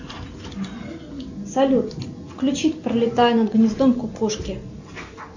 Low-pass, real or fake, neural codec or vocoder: 7.2 kHz; real; none